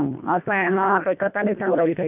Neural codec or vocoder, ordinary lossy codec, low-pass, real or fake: codec, 24 kHz, 1.5 kbps, HILCodec; none; 3.6 kHz; fake